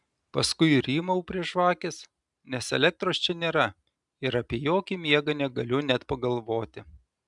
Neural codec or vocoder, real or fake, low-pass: none; real; 10.8 kHz